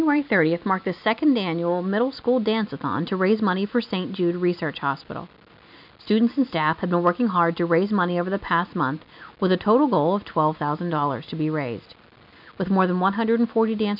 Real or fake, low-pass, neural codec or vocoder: real; 5.4 kHz; none